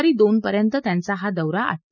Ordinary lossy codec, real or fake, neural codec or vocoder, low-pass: none; real; none; 7.2 kHz